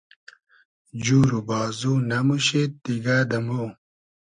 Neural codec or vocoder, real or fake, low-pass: none; real; 9.9 kHz